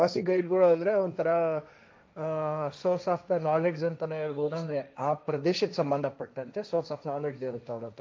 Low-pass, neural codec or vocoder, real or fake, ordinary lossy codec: none; codec, 16 kHz, 1.1 kbps, Voila-Tokenizer; fake; none